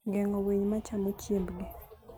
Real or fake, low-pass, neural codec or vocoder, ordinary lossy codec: real; none; none; none